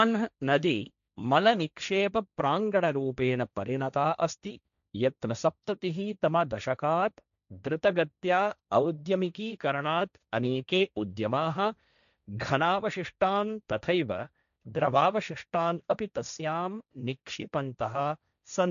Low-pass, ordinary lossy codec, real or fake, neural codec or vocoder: 7.2 kHz; none; fake; codec, 16 kHz, 1.1 kbps, Voila-Tokenizer